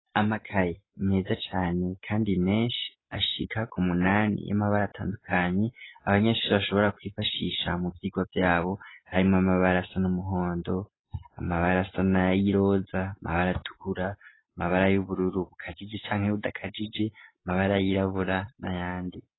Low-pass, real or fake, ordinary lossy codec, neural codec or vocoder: 7.2 kHz; real; AAC, 16 kbps; none